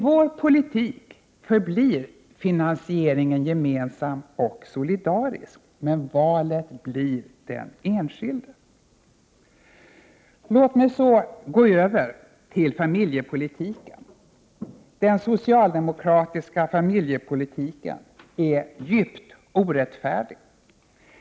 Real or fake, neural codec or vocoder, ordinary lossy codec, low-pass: real; none; none; none